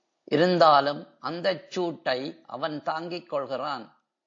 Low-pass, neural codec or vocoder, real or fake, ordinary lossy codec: 7.2 kHz; none; real; AAC, 48 kbps